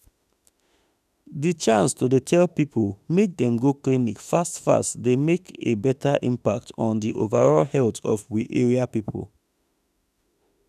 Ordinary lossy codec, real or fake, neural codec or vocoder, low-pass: none; fake; autoencoder, 48 kHz, 32 numbers a frame, DAC-VAE, trained on Japanese speech; 14.4 kHz